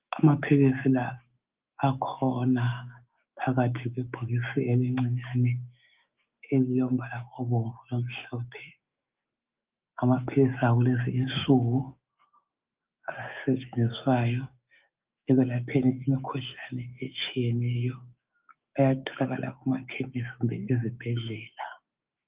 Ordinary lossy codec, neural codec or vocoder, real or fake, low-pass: Opus, 32 kbps; autoencoder, 48 kHz, 128 numbers a frame, DAC-VAE, trained on Japanese speech; fake; 3.6 kHz